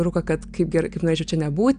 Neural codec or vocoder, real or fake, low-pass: none; real; 9.9 kHz